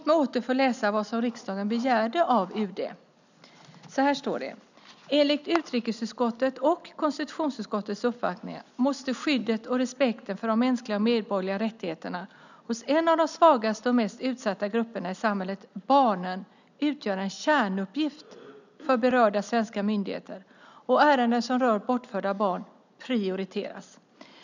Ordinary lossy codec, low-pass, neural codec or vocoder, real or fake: none; 7.2 kHz; none; real